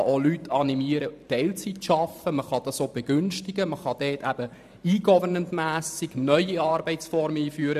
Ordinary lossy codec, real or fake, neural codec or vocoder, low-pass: none; fake; vocoder, 44.1 kHz, 128 mel bands every 512 samples, BigVGAN v2; 14.4 kHz